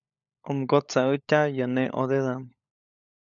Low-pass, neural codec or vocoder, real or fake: 7.2 kHz; codec, 16 kHz, 16 kbps, FunCodec, trained on LibriTTS, 50 frames a second; fake